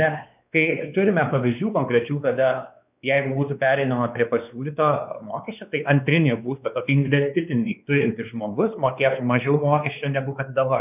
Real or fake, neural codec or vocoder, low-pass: fake; codec, 16 kHz, 2 kbps, X-Codec, WavLM features, trained on Multilingual LibriSpeech; 3.6 kHz